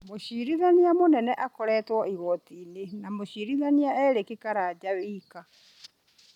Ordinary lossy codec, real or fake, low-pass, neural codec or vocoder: none; real; 19.8 kHz; none